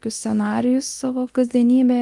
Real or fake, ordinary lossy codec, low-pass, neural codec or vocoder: fake; Opus, 24 kbps; 10.8 kHz; codec, 24 kHz, 0.9 kbps, DualCodec